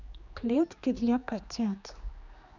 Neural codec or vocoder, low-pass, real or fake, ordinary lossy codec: codec, 16 kHz, 2 kbps, X-Codec, HuBERT features, trained on general audio; 7.2 kHz; fake; none